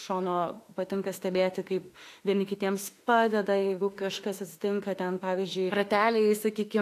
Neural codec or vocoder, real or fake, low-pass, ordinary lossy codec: autoencoder, 48 kHz, 32 numbers a frame, DAC-VAE, trained on Japanese speech; fake; 14.4 kHz; AAC, 64 kbps